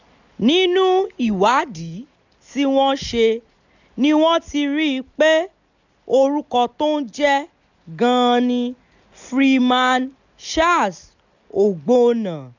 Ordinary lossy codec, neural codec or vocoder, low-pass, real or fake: none; none; 7.2 kHz; real